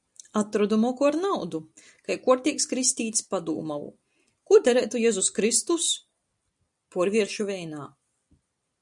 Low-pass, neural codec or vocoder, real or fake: 10.8 kHz; none; real